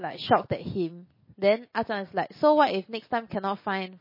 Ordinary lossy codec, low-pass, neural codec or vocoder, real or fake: MP3, 24 kbps; 5.4 kHz; none; real